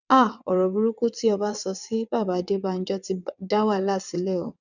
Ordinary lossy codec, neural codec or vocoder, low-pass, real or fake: none; vocoder, 22.05 kHz, 80 mel bands, WaveNeXt; 7.2 kHz; fake